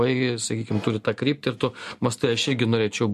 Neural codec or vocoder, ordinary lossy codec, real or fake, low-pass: none; MP3, 64 kbps; real; 14.4 kHz